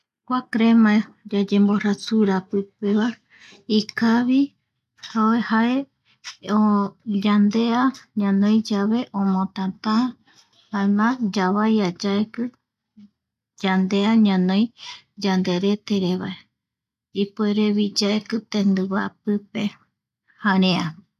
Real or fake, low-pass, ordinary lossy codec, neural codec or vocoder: real; 14.4 kHz; none; none